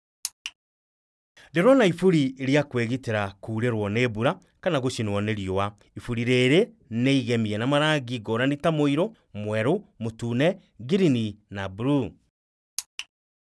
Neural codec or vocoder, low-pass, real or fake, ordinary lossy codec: none; none; real; none